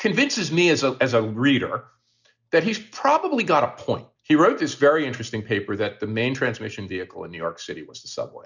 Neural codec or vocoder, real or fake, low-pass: none; real; 7.2 kHz